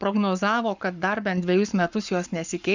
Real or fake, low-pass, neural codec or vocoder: fake; 7.2 kHz; codec, 44.1 kHz, 7.8 kbps, Pupu-Codec